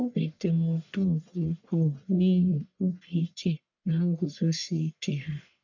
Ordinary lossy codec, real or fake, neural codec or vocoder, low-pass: none; fake; codec, 44.1 kHz, 1.7 kbps, Pupu-Codec; 7.2 kHz